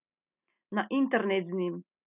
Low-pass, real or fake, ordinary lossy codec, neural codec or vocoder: 3.6 kHz; real; none; none